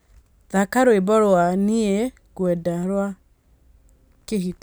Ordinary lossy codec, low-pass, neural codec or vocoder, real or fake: none; none; none; real